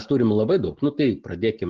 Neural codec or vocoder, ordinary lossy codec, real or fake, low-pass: none; Opus, 16 kbps; real; 7.2 kHz